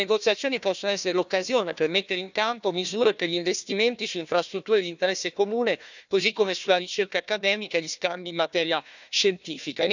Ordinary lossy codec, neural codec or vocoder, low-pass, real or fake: none; codec, 16 kHz, 1 kbps, FunCodec, trained on Chinese and English, 50 frames a second; 7.2 kHz; fake